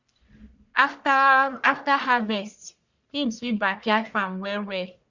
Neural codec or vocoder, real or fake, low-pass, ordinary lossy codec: codec, 44.1 kHz, 1.7 kbps, Pupu-Codec; fake; 7.2 kHz; none